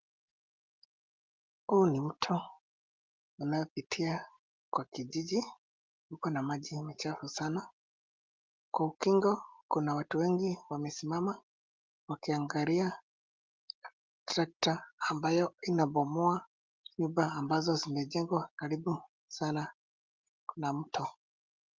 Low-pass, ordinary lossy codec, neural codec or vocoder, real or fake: 7.2 kHz; Opus, 32 kbps; none; real